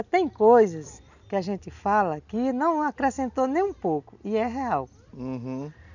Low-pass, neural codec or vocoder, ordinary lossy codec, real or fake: 7.2 kHz; none; none; real